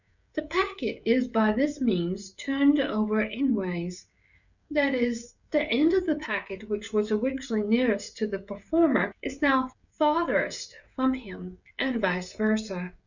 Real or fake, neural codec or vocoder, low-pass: fake; codec, 16 kHz, 16 kbps, FreqCodec, smaller model; 7.2 kHz